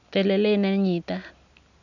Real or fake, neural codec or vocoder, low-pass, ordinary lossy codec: real; none; 7.2 kHz; none